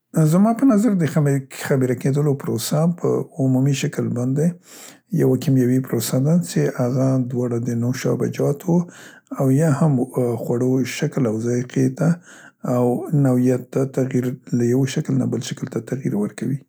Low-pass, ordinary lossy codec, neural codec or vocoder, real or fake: none; none; none; real